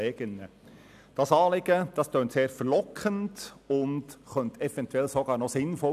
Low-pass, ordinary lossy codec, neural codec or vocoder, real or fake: 14.4 kHz; none; none; real